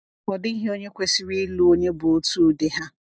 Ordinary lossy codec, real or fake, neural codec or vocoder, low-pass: none; real; none; none